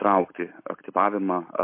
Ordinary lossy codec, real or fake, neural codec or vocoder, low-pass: MP3, 24 kbps; real; none; 3.6 kHz